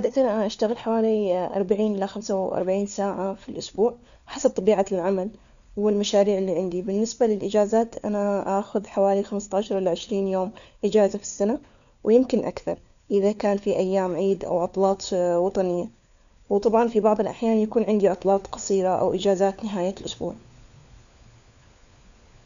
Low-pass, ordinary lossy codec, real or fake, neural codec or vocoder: 7.2 kHz; none; fake; codec, 16 kHz, 4 kbps, FunCodec, trained on LibriTTS, 50 frames a second